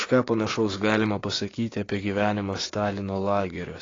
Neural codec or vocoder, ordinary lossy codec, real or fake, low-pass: codec, 16 kHz, 6 kbps, DAC; AAC, 32 kbps; fake; 7.2 kHz